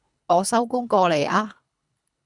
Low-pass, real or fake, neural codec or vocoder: 10.8 kHz; fake; codec, 24 kHz, 3 kbps, HILCodec